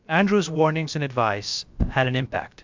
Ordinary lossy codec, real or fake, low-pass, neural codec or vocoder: MP3, 64 kbps; fake; 7.2 kHz; codec, 16 kHz, about 1 kbps, DyCAST, with the encoder's durations